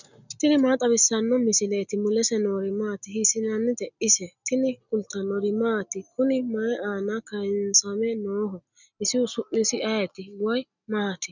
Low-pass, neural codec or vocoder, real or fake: 7.2 kHz; none; real